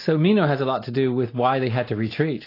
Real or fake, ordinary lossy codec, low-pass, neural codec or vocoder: real; AAC, 32 kbps; 5.4 kHz; none